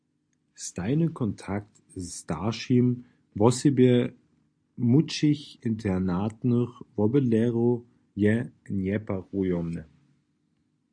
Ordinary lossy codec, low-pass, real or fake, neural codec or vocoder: MP3, 64 kbps; 9.9 kHz; real; none